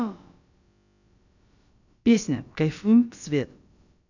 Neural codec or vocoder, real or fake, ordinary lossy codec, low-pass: codec, 16 kHz, about 1 kbps, DyCAST, with the encoder's durations; fake; none; 7.2 kHz